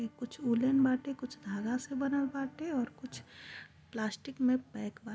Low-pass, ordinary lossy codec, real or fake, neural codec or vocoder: none; none; real; none